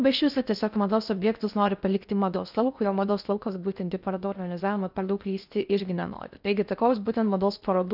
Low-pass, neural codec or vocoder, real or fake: 5.4 kHz; codec, 16 kHz in and 24 kHz out, 0.6 kbps, FocalCodec, streaming, 4096 codes; fake